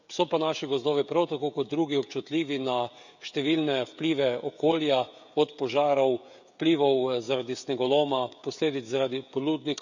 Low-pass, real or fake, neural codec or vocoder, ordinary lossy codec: 7.2 kHz; fake; codec, 16 kHz, 16 kbps, FreqCodec, smaller model; none